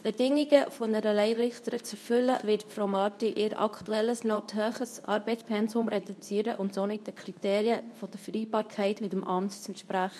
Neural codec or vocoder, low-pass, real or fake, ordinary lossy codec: codec, 24 kHz, 0.9 kbps, WavTokenizer, medium speech release version 2; none; fake; none